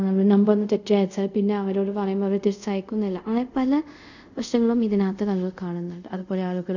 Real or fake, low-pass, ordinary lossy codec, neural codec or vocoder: fake; 7.2 kHz; none; codec, 24 kHz, 0.5 kbps, DualCodec